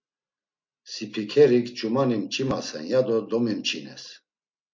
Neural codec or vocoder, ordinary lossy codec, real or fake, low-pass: none; MP3, 48 kbps; real; 7.2 kHz